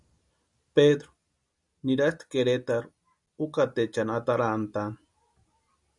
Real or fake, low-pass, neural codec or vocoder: real; 10.8 kHz; none